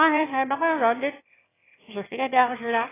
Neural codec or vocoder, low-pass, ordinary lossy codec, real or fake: autoencoder, 22.05 kHz, a latent of 192 numbers a frame, VITS, trained on one speaker; 3.6 kHz; AAC, 16 kbps; fake